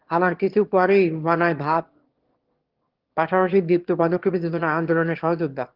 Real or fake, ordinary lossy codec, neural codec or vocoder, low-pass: fake; Opus, 16 kbps; autoencoder, 22.05 kHz, a latent of 192 numbers a frame, VITS, trained on one speaker; 5.4 kHz